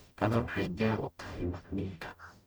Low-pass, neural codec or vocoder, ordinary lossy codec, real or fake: none; codec, 44.1 kHz, 0.9 kbps, DAC; none; fake